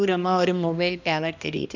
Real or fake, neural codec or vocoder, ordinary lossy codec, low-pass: fake; codec, 16 kHz, 1 kbps, X-Codec, HuBERT features, trained on balanced general audio; none; 7.2 kHz